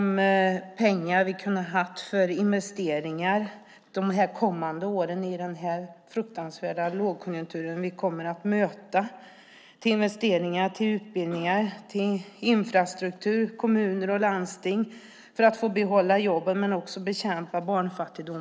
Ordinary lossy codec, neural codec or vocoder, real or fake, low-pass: none; none; real; none